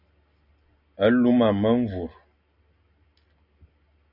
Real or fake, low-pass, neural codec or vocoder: real; 5.4 kHz; none